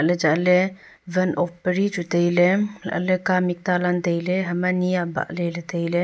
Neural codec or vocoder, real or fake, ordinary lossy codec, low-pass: none; real; none; none